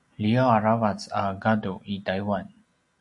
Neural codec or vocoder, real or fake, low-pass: none; real; 10.8 kHz